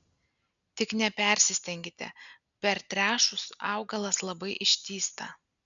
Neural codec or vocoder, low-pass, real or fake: none; 7.2 kHz; real